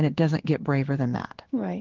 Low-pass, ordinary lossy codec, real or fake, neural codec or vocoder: 7.2 kHz; Opus, 16 kbps; fake; codec, 16 kHz in and 24 kHz out, 1 kbps, XY-Tokenizer